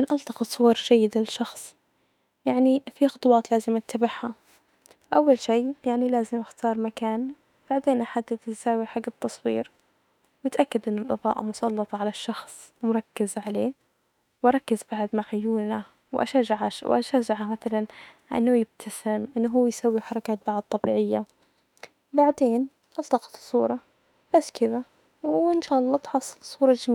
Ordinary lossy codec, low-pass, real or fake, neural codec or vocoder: none; 19.8 kHz; fake; autoencoder, 48 kHz, 32 numbers a frame, DAC-VAE, trained on Japanese speech